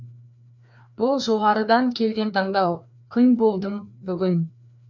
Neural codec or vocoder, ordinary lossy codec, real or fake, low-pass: codec, 16 kHz, 2 kbps, FreqCodec, larger model; none; fake; 7.2 kHz